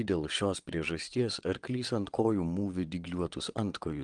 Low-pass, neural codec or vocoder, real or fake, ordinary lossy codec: 9.9 kHz; vocoder, 22.05 kHz, 80 mel bands, WaveNeXt; fake; Opus, 24 kbps